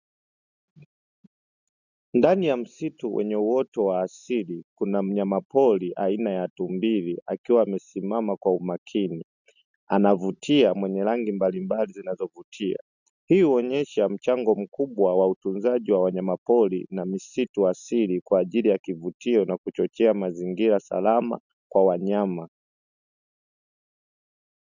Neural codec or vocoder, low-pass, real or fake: none; 7.2 kHz; real